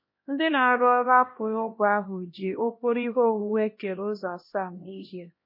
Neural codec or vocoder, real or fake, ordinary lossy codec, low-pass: codec, 16 kHz, 1 kbps, X-Codec, HuBERT features, trained on LibriSpeech; fake; MP3, 24 kbps; 5.4 kHz